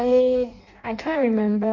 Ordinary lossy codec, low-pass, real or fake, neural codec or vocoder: MP3, 48 kbps; 7.2 kHz; fake; codec, 16 kHz in and 24 kHz out, 0.6 kbps, FireRedTTS-2 codec